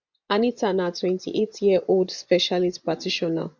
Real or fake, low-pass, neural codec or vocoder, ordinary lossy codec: fake; 7.2 kHz; vocoder, 24 kHz, 100 mel bands, Vocos; none